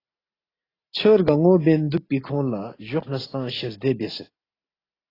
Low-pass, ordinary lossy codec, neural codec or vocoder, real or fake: 5.4 kHz; AAC, 24 kbps; none; real